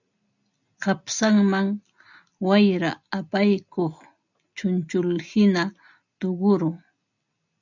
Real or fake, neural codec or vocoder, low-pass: real; none; 7.2 kHz